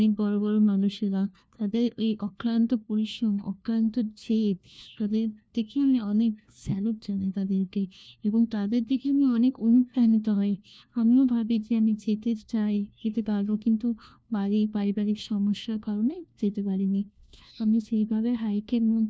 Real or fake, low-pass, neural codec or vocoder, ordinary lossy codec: fake; none; codec, 16 kHz, 1 kbps, FunCodec, trained on LibriTTS, 50 frames a second; none